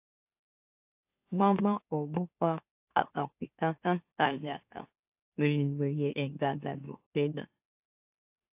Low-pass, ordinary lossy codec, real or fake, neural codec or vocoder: 3.6 kHz; AAC, 32 kbps; fake; autoencoder, 44.1 kHz, a latent of 192 numbers a frame, MeloTTS